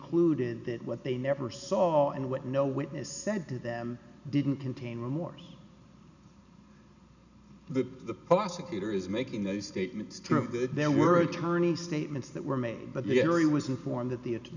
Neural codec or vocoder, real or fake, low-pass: none; real; 7.2 kHz